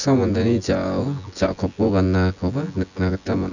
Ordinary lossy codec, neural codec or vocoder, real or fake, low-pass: none; vocoder, 24 kHz, 100 mel bands, Vocos; fake; 7.2 kHz